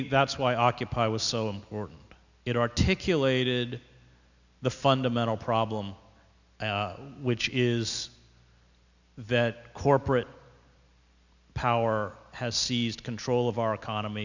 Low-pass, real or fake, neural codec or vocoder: 7.2 kHz; real; none